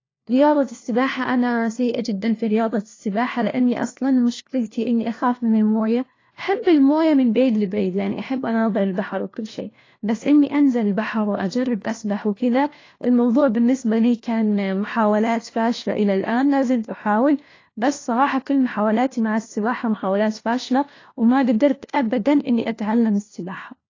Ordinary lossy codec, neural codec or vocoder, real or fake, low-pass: AAC, 32 kbps; codec, 16 kHz, 1 kbps, FunCodec, trained on LibriTTS, 50 frames a second; fake; 7.2 kHz